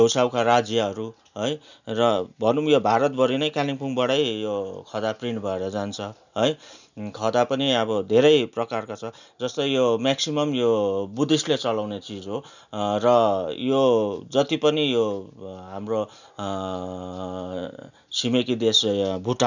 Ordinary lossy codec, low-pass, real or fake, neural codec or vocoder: none; 7.2 kHz; real; none